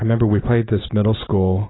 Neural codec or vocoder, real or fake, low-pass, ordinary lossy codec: none; real; 7.2 kHz; AAC, 16 kbps